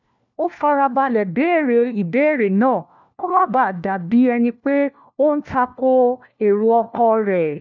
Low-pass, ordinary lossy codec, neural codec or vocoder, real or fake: 7.2 kHz; none; codec, 16 kHz, 1 kbps, FunCodec, trained on LibriTTS, 50 frames a second; fake